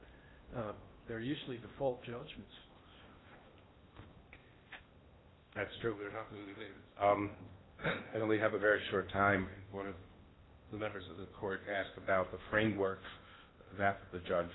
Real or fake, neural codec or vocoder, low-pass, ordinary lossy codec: fake; codec, 16 kHz in and 24 kHz out, 0.8 kbps, FocalCodec, streaming, 65536 codes; 7.2 kHz; AAC, 16 kbps